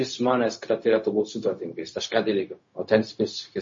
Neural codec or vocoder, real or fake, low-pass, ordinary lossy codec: codec, 16 kHz, 0.4 kbps, LongCat-Audio-Codec; fake; 7.2 kHz; MP3, 32 kbps